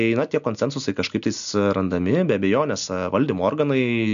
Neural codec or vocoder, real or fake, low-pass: none; real; 7.2 kHz